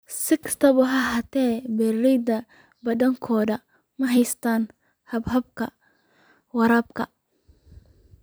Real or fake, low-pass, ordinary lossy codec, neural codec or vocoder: fake; none; none; vocoder, 44.1 kHz, 128 mel bands every 256 samples, BigVGAN v2